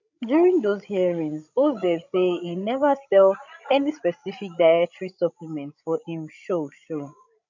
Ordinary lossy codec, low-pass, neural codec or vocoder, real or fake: none; 7.2 kHz; codec, 16 kHz, 16 kbps, FreqCodec, larger model; fake